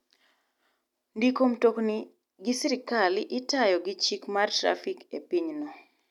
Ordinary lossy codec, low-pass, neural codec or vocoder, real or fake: none; 19.8 kHz; none; real